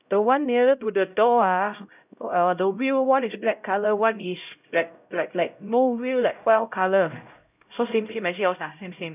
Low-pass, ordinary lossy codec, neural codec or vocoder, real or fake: 3.6 kHz; none; codec, 16 kHz, 0.5 kbps, X-Codec, HuBERT features, trained on LibriSpeech; fake